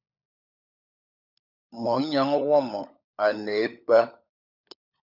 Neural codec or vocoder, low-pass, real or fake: codec, 16 kHz, 16 kbps, FunCodec, trained on LibriTTS, 50 frames a second; 5.4 kHz; fake